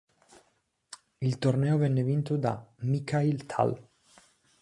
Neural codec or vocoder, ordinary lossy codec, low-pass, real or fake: none; MP3, 64 kbps; 10.8 kHz; real